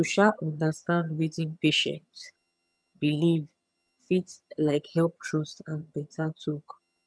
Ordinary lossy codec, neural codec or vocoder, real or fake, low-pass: none; vocoder, 22.05 kHz, 80 mel bands, HiFi-GAN; fake; none